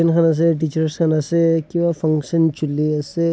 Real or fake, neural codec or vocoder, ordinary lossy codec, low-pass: real; none; none; none